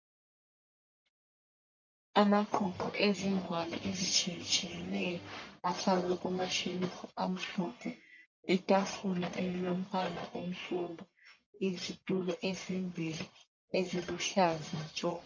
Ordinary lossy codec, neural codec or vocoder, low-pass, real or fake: MP3, 48 kbps; codec, 44.1 kHz, 1.7 kbps, Pupu-Codec; 7.2 kHz; fake